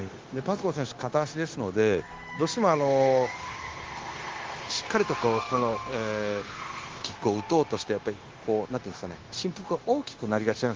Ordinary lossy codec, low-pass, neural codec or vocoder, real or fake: Opus, 16 kbps; 7.2 kHz; codec, 16 kHz, 0.9 kbps, LongCat-Audio-Codec; fake